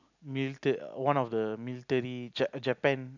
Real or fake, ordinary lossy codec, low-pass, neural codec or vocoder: real; none; 7.2 kHz; none